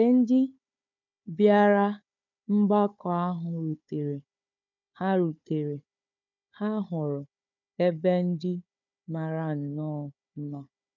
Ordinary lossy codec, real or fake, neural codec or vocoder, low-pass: none; fake; codec, 16 kHz, 4 kbps, FunCodec, trained on Chinese and English, 50 frames a second; 7.2 kHz